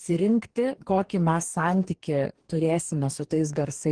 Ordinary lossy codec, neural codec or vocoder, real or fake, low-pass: Opus, 16 kbps; codec, 44.1 kHz, 2.6 kbps, DAC; fake; 9.9 kHz